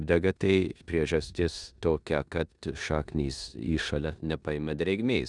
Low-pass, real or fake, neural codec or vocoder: 10.8 kHz; fake; codec, 16 kHz in and 24 kHz out, 0.9 kbps, LongCat-Audio-Codec, four codebook decoder